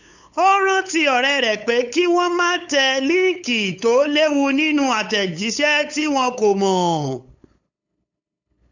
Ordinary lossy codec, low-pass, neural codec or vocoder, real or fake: none; 7.2 kHz; codec, 16 kHz, 8 kbps, FunCodec, trained on LibriTTS, 25 frames a second; fake